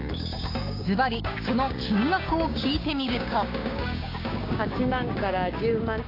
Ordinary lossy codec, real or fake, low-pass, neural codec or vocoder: AAC, 32 kbps; fake; 5.4 kHz; codec, 16 kHz, 6 kbps, DAC